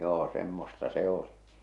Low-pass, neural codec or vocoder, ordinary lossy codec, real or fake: 10.8 kHz; none; AAC, 64 kbps; real